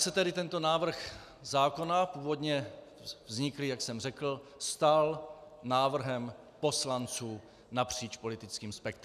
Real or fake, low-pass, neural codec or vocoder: real; 14.4 kHz; none